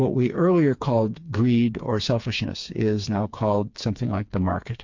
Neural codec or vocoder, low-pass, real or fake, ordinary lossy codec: codec, 16 kHz, 4 kbps, FreqCodec, smaller model; 7.2 kHz; fake; MP3, 48 kbps